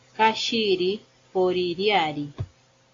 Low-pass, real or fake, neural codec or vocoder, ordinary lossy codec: 7.2 kHz; real; none; AAC, 32 kbps